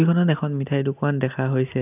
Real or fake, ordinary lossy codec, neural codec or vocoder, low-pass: real; none; none; 3.6 kHz